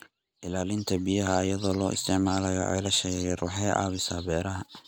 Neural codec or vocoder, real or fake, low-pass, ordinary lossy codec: none; real; none; none